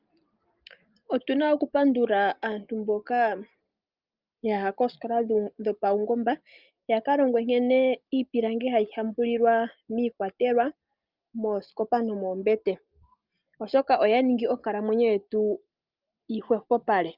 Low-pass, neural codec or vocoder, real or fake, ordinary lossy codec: 5.4 kHz; none; real; Opus, 24 kbps